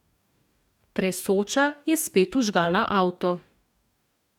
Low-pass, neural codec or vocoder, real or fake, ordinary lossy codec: 19.8 kHz; codec, 44.1 kHz, 2.6 kbps, DAC; fake; none